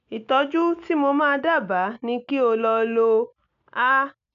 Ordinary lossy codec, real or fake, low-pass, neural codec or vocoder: MP3, 96 kbps; real; 7.2 kHz; none